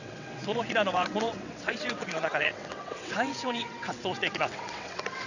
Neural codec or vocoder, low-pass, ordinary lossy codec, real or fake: vocoder, 22.05 kHz, 80 mel bands, WaveNeXt; 7.2 kHz; none; fake